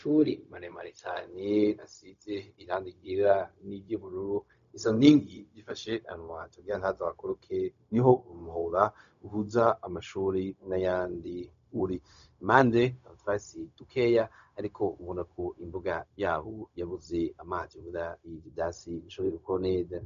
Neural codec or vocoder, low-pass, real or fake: codec, 16 kHz, 0.4 kbps, LongCat-Audio-Codec; 7.2 kHz; fake